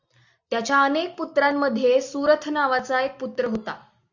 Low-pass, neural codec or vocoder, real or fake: 7.2 kHz; none; real